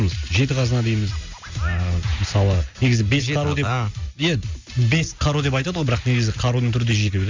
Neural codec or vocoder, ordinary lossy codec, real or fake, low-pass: none; MP3, 64 kbps; real; 7.2 kHz